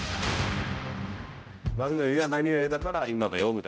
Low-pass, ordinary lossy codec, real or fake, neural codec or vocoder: none; none; fake; codec, 16 kHz, 0.5 kbps, X-Codec, HuBERT features, trained on general audio